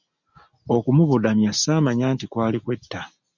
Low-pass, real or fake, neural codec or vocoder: 7.2 kHz; real; none